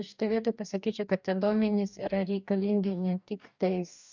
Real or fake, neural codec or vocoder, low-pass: fake; codec, 44.1 kHz, 2.6 kbps, DAC; 7.2 kHz